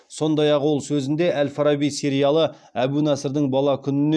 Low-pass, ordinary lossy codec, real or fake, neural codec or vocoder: none; none; real; none